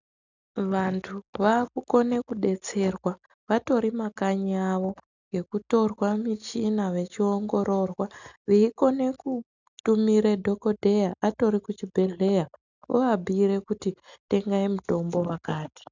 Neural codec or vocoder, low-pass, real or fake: none; 7.2 kHz; real